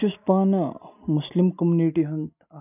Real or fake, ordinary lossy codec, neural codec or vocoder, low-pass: real; none; none; 3.6 kHz